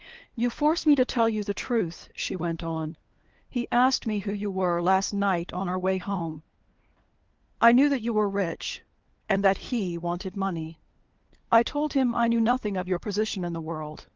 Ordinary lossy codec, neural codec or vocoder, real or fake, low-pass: Opus, 16 kbps; codec, 16 kHz, 4 kbps, FunCodec, trained on LibriTTS, 50 frames a second; fake; 7.2 kHz